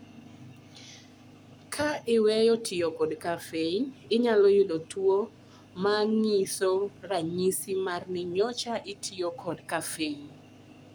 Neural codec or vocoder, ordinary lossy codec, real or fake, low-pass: codec, 44.1 kHz, 7.8 kbps, Pupu-Codec; none; fake; none